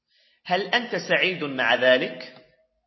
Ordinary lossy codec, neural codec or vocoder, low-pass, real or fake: MP3, 24 kbps; none; 7.2 kHz; real